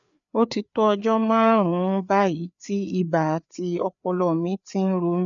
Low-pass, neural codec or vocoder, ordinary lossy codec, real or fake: 7.2 kHz; codec, 16 kHz, 4 kbps, FreqCodec, larger model; none; fake